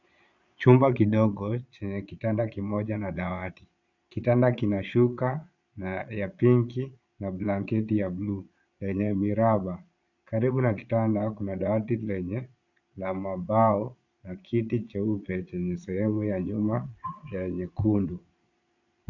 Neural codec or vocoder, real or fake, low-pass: vocoder, 22.05 kHz, 80 mel bands, Vocos; fake; 7.2 kHz